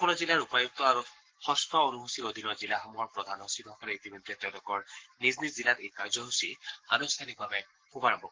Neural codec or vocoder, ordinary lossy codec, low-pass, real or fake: codec, 44.1 kHz, 7.8 kbps, Pupu-Codec; Opus, 16 kbps; 7.2 kHz; fake